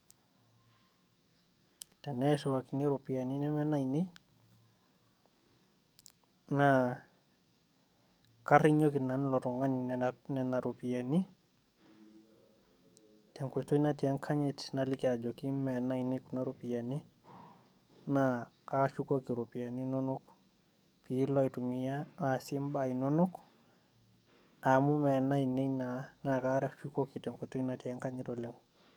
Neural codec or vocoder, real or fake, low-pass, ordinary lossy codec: codec, 44.1 kHz, 7.8 kbps, DAC; fake; none; none